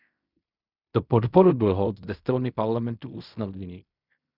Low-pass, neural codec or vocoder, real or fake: 5.4 kHz; codec, 16 kHz in and 24 kHz out, 0.4 kbps, LongCat-Audio-Codec, fine tuned four codebook decoder; fake